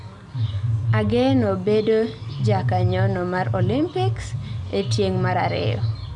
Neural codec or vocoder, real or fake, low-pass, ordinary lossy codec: none; real; 10.8 kHz; none